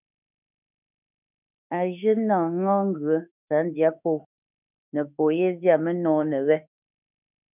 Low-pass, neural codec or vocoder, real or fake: 3.6 kHz; autoencoder, 48 kHz, 32 numbers a frame, DAC-VAE, trained on Japanese speech; fake